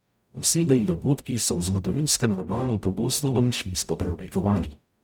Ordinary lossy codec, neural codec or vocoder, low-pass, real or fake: none; codec, 44.1 kHz, 0.9 kbps, DAC; none; fake